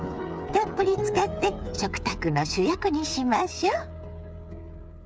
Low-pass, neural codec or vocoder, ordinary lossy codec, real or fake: none; codec, 16 kHz, 8 kbps, FreqCodec, smaller model; none; fake